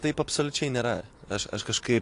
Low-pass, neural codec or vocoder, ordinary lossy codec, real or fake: 10.8 kHz; none; AAC, 48 kbps; real